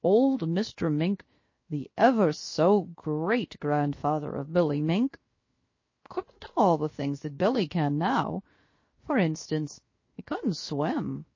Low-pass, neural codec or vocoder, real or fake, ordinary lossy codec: 7.2 kHz; codec, 16 kHz, 0.7 kbps, FocalCodec; fake; MP3, 32 kbps